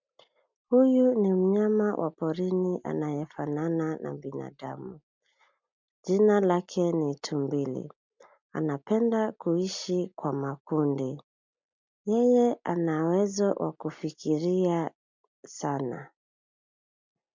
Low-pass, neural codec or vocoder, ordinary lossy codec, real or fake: 7.2 kHz; none; MP3, 64 kbps; real